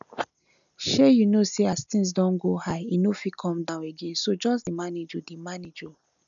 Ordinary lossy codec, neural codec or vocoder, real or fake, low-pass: none; none; real; 7.2 kHz